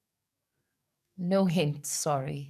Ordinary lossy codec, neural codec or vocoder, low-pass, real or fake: none; codec, 44.1 kHz, 7.8 kbps, DAC; 14.4 kHz; fake